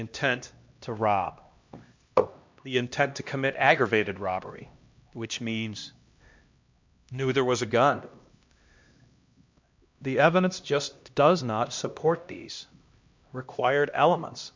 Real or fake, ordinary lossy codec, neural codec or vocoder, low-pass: fake; MP3, 64 kbps; codec, 16 kHz, 1 kbps, X-Codec, HuBERT features, trained on LibriSpeech; 7.2 kHz